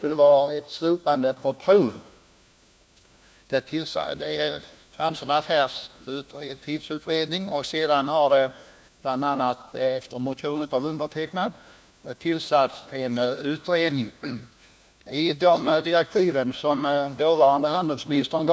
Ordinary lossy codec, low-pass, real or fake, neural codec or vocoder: none; none; fake; codec, 16 kHz, 1 kbps, FunCodec, trained on LibriTTS, 50 frames a second